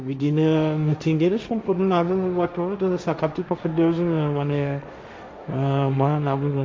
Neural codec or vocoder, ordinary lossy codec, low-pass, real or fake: codec, 16 kHz, 1.1 kbps, Voila-Tokenizer; none; none; fake